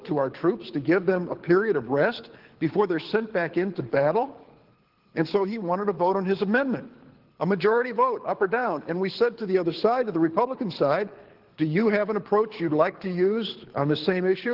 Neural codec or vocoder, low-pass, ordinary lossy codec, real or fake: codec, 24 kHz, 6 kbps, HILCodec; 5.4 kHz; Opus, 16 kbps; fake